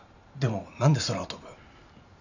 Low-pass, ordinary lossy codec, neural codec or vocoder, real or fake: 7.2 kHz; none; vocoder, 44.1 kHz, 128 mel bands every 512 samples, BigVGAN v2; fake